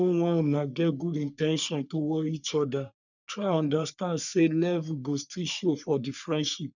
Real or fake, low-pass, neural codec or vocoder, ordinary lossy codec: fake; 7.2 kHz; codec, 44.1 kHz, 3.4 kbps, Pupu-Codec; none